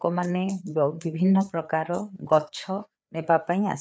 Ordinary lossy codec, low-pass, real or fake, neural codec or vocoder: none; none; fake; codec, 16 kHz, 4 kbps, FreqCodec, larger model